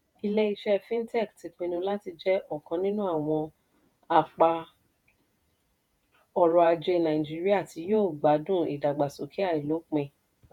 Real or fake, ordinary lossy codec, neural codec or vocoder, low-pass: fake; none; vocoder, 48 kHz, 128 mel bands, Vocos; 19.8 kHz